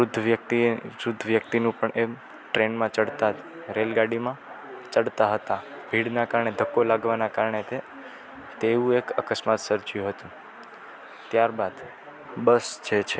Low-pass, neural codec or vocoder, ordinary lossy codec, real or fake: none; none; none; real